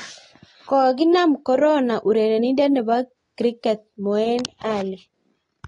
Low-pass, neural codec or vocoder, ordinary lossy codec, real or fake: 10.8 kHz; none; AAC, 32 kbps; real